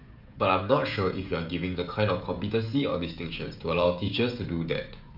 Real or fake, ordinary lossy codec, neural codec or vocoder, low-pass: fake; AAC, 48 kbps; codec, 16 kHz, 16 kbps, FreqCodec, smaller model; 5.4 kHz